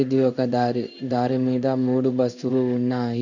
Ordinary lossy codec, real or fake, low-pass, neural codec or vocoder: none; fake; 7.2 kHz; codec, 16 kHz in and 24 kHz out, 1 kbps, XY-Tokenizer